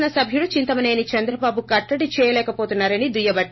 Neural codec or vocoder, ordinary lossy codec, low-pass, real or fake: none; MP3, 24 kbps; 7.2 kHz; real